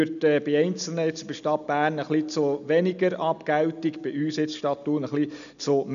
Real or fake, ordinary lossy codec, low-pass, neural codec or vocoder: real; none; 7.2 kHz; none